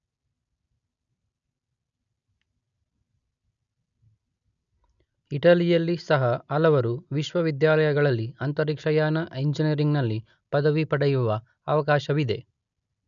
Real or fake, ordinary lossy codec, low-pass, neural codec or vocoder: real; none; 7.2 kHz; none